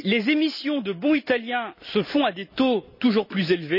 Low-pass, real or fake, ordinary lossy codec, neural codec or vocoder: 5.4 kHz; real; none; none